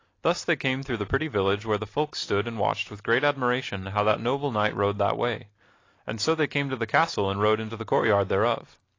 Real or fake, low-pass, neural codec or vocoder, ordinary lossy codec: real; 7.2 kHz; none; AAC, 32 kbps